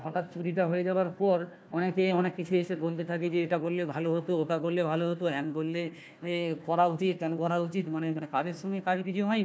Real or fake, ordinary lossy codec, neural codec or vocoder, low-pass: fake; none; codec, 16 kHz, 1 kbps, FunCodec, trained on Chinese and English, 50 frames a second; none